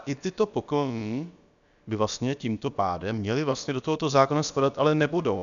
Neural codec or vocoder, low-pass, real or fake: codec, 16 kHz, about 1 kbps, DyCAST, with the encoder's durations; 7.2 kHz; fake